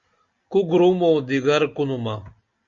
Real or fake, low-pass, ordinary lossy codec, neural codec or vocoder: real; 7.2 kHz; Opus, 64 kbps; none